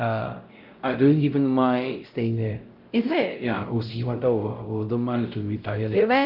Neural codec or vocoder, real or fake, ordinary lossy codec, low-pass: codec, 16 kHz, 0.5 kbps, X-Codec, WavLM features, trained on Multilingual LibriSpeech; fake; Opus, 24 kbps; 5.4 kHz